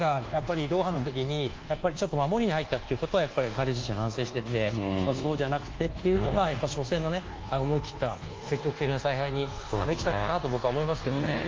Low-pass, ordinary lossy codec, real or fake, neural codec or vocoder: 7.2 kHz; Opus, 24 kbps; fake; codec, 24 kHz, 1.2 kbps, DualCodec